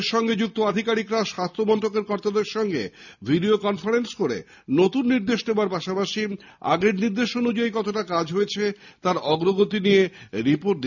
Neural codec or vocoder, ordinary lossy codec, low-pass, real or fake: none; none; 7.2 kHz; real